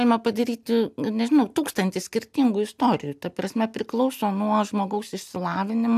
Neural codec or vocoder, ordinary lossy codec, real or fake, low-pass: none; AAC, 96 kbps; real; 14.4 kHz